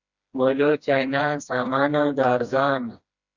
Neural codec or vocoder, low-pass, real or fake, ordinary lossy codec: codec, 16 kHz, 1 kbps, FreqCodec, smaller model; 7.2 kHz; fake; Opus, 64 kbps